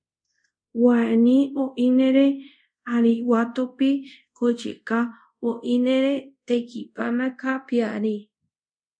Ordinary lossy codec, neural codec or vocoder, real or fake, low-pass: MP3, 64 kbps; codec, 24 kHz, 0.5 kbps, DualCodec; fake; 9.9 kHz